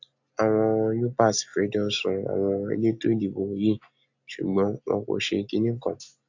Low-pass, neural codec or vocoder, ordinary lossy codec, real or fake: 7.2 kHz; none; AAC, 48 kbps; real